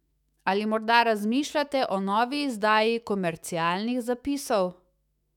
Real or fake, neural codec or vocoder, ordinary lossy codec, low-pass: fake; autoencoder, 48 kHz, 128 numbers a frame, DAC-VAE, trained on Japanese speech; none; 19.8 kHz